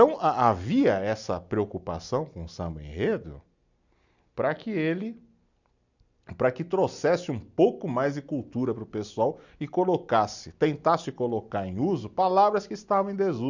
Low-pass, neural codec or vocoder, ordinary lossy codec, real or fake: 7.2 kHz; none; none; real